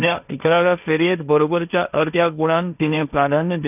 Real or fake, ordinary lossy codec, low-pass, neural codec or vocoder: fake; none; 3.6 kHz; codec, 16 kHz, 1.1 kbps, Voila-Tokenizer